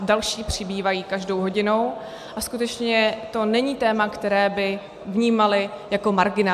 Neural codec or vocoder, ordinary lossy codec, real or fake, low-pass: none; AAC, 96 kbps; real; 14.4 kHz